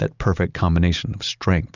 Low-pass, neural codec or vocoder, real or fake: 7.2 kHz; none; real